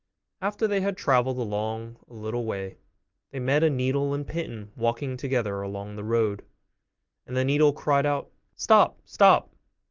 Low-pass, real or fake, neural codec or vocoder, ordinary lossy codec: 7.2 kHz; real; none; Opus, 32 kbps